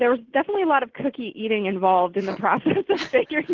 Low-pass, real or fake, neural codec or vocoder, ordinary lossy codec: 7.2 kHz; real; none; Opus, 16 kbps